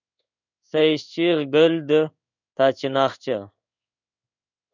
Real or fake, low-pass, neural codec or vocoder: fake; 7.2 kHz; codec, 16 kHz in and 24 kHz out, 1 kbps, XY-Tokenizer